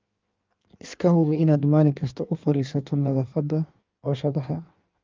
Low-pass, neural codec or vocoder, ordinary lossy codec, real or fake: 7.2 kHz; codec, 16 kHz in and 24 kHz out, 1.1 kbps, FireRedTTS-2 codec; Opus, 32 kbps; fake